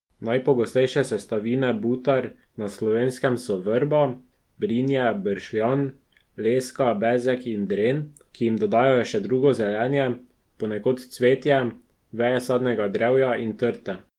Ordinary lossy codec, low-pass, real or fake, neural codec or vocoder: Opus, 24 kbps; 19.8 kHz; fake; autoencoder, 48 kHz, 128 numbers a frame, DAC-VAE, trained on Japanese speech